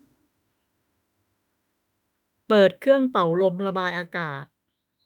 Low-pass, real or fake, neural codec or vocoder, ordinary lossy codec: 19.8 kHz; fake; autoencoder, 48 kHz, 32 numbers a frame, DAC-VAE, trained on Japanese speech; none